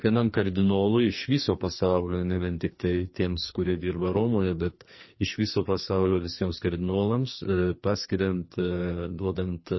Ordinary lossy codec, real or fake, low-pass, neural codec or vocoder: MP3, 24 kbps; fake; 7.2 kHz; codec, 44.1 kHz, 2.6 kbps, SNAC